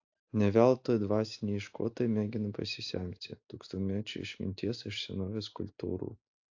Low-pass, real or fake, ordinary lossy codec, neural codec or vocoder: 7.2 kHz; real; AAC, 48 kbps; none